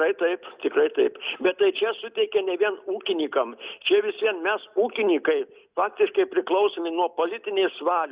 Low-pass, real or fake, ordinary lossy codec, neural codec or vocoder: 3.6 kHz; real; Opus, 64 kbps; none